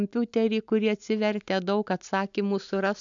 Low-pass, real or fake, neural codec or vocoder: 7.2 kHz; real; none